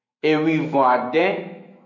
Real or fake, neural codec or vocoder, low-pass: fake; autoencoder, 48 kHz, 128 numbers a frame, DAC-VAE, trained on Japanese speech; 7.2 kHz